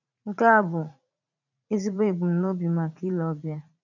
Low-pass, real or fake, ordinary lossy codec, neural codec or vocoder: 7.2 kHz; fake; none; vocoder, 44.1 kHz, 80 mel bands, Vocos